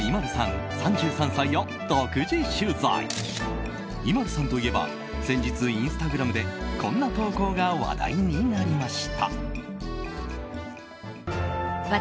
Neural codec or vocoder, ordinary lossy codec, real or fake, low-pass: none; none; real; none